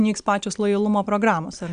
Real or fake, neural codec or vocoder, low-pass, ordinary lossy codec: real; none; 9.9 kHz; Opus, 64 kbps